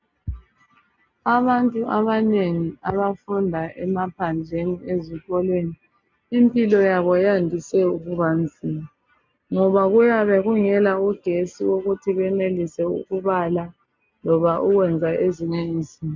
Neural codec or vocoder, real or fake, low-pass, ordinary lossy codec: none; real; 7.2 kHz; MP3, 64 kbps